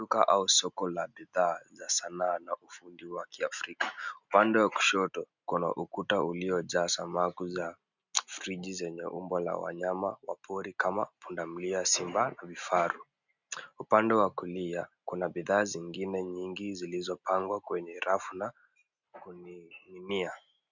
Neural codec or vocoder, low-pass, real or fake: none; 7.2 kHz; real